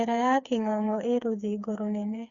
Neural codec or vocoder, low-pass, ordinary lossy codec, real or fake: codec, 16 kHz, 4 kbps, FreqCodec, smaller model; 7.2 kHz; Opus, 64 kbps; fake